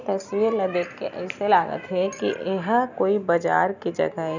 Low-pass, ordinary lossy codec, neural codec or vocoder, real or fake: 7.2 kHz; none; none; real